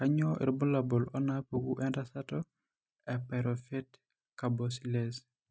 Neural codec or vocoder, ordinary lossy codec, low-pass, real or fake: none; none; none; real